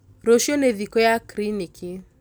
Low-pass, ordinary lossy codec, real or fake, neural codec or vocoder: none; none; real; none